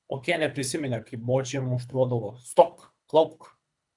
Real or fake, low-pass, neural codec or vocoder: fake; 10.8 kHz; codec, 24 kHz, 3 kbps, HILCodec